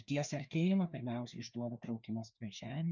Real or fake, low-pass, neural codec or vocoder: fake; 7.2 kHz; codec, 16 kHz, 2 kbps, FreqCodec, larger model